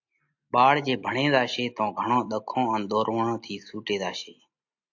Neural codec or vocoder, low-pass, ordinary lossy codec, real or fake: none; 7.2 kHz; AAC, 48 kbps; real